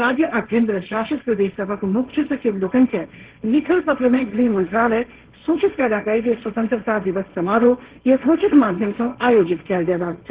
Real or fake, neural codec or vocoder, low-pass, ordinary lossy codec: fake; codec, 16 kHz, 1.1 kbps, Voila-Tokenizer; 3.6 kHz; Opus, 16 kbps